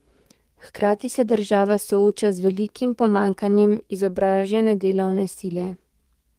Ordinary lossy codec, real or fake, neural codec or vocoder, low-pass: Opus, 24 kbps; fake; codec, 32 kHz, 1.9 kbps, SNAC; 14.4 kHz